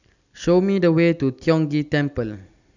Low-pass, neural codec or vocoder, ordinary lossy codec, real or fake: 7.2 kHz; none; none; real